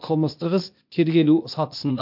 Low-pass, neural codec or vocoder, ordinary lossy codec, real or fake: 5.4 kHz; codec, 16 kHz, 0.8 kbps, ZipCodec; MP3, 48 kbps; fake